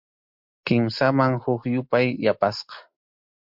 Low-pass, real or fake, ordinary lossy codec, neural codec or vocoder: 5.4 kHz; real; MP3, 48 kbps; none